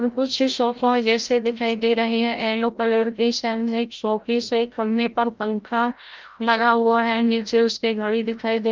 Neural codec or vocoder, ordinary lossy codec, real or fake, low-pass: codec, 16 kHz, 0.5 kbps, FreqCodec, larger model; Opus, 24 kbps; fake; 7.2 kHz